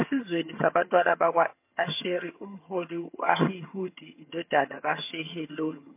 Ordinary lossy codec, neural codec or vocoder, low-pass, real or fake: MP3, 24 kbps; vocoder, 22.05 kHz, 80 mel bands, HiFi-GAN; 3.6 kHz; fake